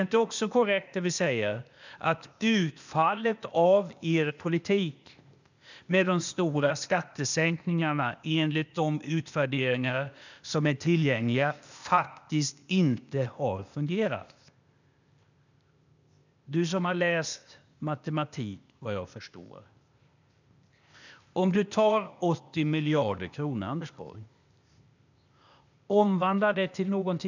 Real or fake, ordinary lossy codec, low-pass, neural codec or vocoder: fake; none; 7.2 kHz; codec, 16 kHz, 0.8 kbps, ZipCodec